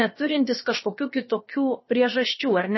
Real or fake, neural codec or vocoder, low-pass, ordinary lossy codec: fake; codec, 16 kHz, about 1 kbps, DyCAST, with the encoder's durations; 7.2 kHz; MP3, 24 kbps